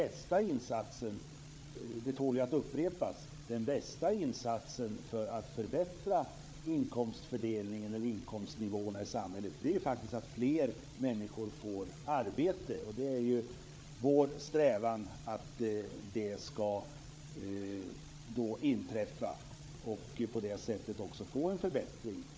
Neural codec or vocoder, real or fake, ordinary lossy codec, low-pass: codec, 16 kHz, 8 kbps, FreqCodec, larger model; fake; none; none